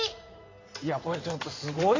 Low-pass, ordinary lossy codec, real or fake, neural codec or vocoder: 7.2 kHz; AAC, 32 kbps; fake; codec, 16 kHz in and 24 kHz out, 2.2 kbps, FireRedTTS-2 codec